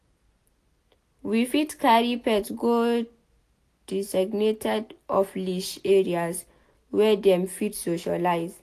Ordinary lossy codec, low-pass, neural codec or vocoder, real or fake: AAC, 64 kbps; 14.4 kHz; none; real